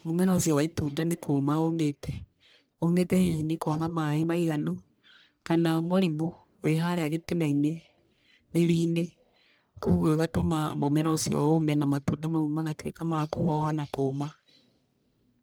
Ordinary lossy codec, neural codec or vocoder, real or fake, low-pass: none; codec, 44.1 kHz, 1.7 kbps, Pupu-Codec; fake; none